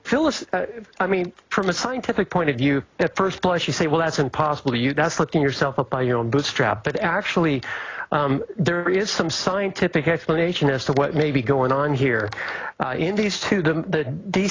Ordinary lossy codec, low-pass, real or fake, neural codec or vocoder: AAC, 32 kbps; 7.2 kHz; real; none